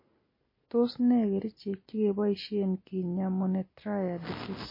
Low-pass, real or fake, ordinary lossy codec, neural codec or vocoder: 5.4 kHz; real; MP3, 24 kbps; none